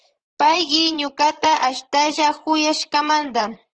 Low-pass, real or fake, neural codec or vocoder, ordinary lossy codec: 9.9 kHz; fake; vocoder, 44.1 kHz, 128 mel bands, Pupu-Vocoder; Opus, 16 kbps